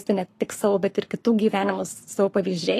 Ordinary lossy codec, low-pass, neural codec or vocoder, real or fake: AAC, 48 kbps; 14.4 kHz; codec, 44.1 kHz, 7.8 kbps, Pupu-Codec; fake